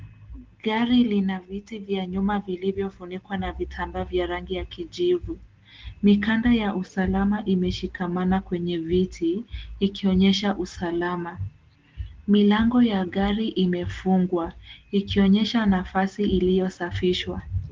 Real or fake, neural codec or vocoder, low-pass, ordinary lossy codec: real; none; 7.2 kHz; Opus, 16 kbps